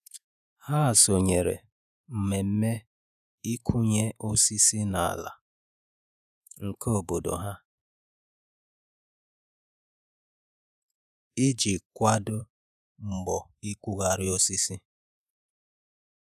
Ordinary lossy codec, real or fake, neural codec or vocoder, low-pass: none; fake; vocoder, 48 kHz, 128 mel bands, Vocos; 14.4 kHz